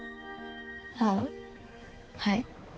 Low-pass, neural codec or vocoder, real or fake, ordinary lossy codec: none; codec, 16 kHz, 4 kbps, X-Codec, HuBERT features, trained on balanced general audio; fake; none